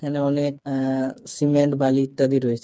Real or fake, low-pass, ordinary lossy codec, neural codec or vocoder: fake; none; none; codec, 16 kHz, 4 kbps, FreqCodec, smaller model